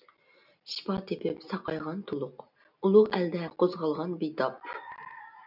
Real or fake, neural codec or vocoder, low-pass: real; none; 5.4 kHz